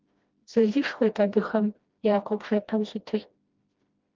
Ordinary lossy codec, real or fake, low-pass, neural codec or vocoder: Opus, 32 kbps; fake; 7.2 kHz; codec, 16 kHz, 1 kbps, FreqCodec, smaller model